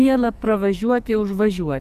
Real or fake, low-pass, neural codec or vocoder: fake; 14.4 kHz; codec, 44.1 kHz, 2.6 kbps, SNAC